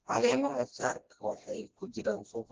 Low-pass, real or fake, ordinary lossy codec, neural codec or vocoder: 7.2 kHz; fake; Opus, 16 kbps; codec, 16 kHz, 1 kbps, FreqCodec, smaller model